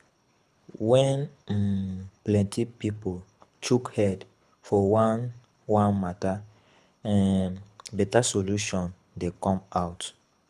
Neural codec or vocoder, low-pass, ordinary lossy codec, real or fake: codec, 24 kHz, 6 kbps, HILCodec; none; none; fake